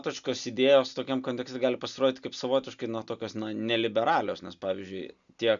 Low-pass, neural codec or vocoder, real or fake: 7.2 kHz; none; real